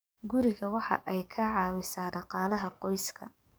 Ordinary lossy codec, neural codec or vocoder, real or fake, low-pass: none; codec, 44.1 kHz, 7.8 kbps, DAC; fake; none